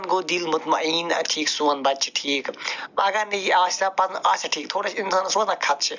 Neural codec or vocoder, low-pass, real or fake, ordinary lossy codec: none; 7.2 kHz; real; none